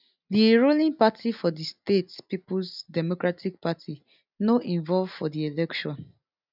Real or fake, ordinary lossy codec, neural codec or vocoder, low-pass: real; none; none; 5.4 kHz